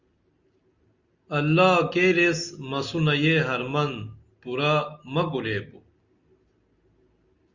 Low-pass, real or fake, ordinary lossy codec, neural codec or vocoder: 7.2 kHz; real; Opus, 64 kbps; none